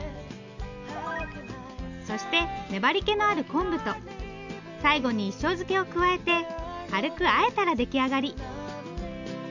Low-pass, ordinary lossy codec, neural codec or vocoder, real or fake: 7.2 kHz; none; none; real